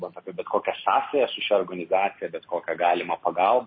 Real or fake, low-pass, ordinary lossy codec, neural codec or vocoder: real; 7.2 kHz; MP3, 24 kbps; none